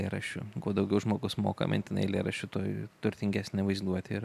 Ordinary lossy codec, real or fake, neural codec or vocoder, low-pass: AAC, 96 kbps; real; none; 14.4 kHz